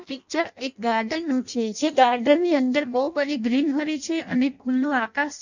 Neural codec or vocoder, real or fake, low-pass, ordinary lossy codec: codec, 16 kHz in and 24 kHz out, 0.6 kbps, FireRedTTS-2 codec; fake; 7.2 kHz; none